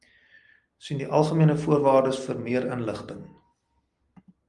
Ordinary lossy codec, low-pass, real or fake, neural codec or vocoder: Opus, 32 kbps; 10.8 kHz; real; none